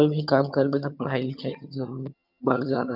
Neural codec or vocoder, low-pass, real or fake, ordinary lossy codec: vocoder, 22.05 kHz, 80 mel bands, HiFi-GAN; 5.4 kHz; fake; none